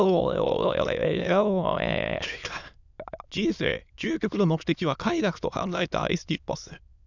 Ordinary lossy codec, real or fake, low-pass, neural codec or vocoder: none; fake; 7.2 kHz; autoencoder, 22.05 kHz, a latent of 192 numbers a frame, VITS, trained on many speakers